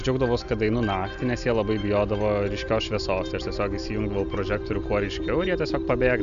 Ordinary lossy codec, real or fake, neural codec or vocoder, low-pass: MP3, 96 kbps; real; none; 7.2 kHz